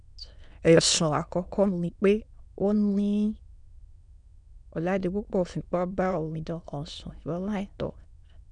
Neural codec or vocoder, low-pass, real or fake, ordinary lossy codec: autoencoder, 22.05 kHz, a latent of 192 numbers a frame, VITS, trained on many speakers; 9.9 kHz; fake; none